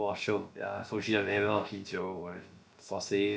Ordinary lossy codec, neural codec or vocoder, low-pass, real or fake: none; codec, 16 kHz, about 1 kbps, DyCAST, with the encoder's durations; none; fake